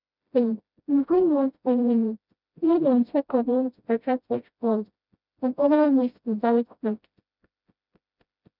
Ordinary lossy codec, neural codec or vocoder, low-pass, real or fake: none; codec, 16 kHz, 0.5 kbps, FreqCodec, smaller model; 5.4 kHz; fake